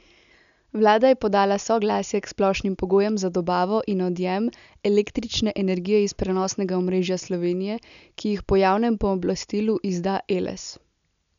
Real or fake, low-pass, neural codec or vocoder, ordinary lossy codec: real; 7.2 kHz; none; none